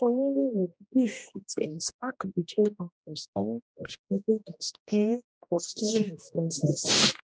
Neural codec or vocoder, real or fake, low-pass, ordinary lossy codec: codec, 16 kHz, 1 kbps, X-Codec, HuBERT features, trained on general audio; fake; none; none